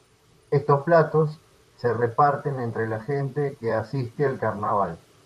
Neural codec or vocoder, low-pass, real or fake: vocoder, 44.1 kHz, 128 mel bands, Pupu-Vocoder; 14.4 kHz; fake